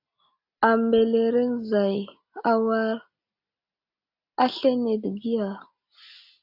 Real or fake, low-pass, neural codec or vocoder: real; 5.4 kHz; none